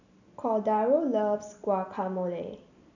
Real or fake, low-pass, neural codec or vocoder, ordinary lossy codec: real; 7.2 kHz; none; none